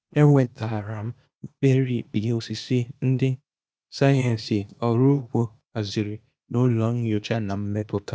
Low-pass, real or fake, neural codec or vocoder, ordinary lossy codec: none; fake; codec, 16 kHz, 0.8 kbps, ZipCodec; none